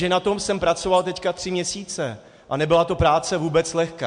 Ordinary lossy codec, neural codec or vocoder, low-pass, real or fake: MP3, 64 kbps; none; 9.9 kHz; real